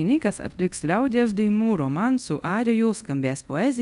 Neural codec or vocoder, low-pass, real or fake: codec, 24 kHz, 0.5 kbps, DualCodec; 10.8 kHz; fake